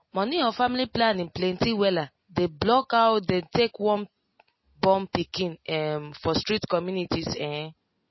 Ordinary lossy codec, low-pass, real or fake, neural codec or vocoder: MP3, 24 kbps; 7.2 kHz; real; none